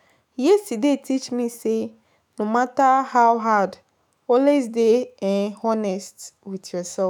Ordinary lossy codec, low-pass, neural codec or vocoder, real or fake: none; none; autoencoder, 48 kHz, 128 numbers a frame, DAC-VAE, trained on Japanese speech; fake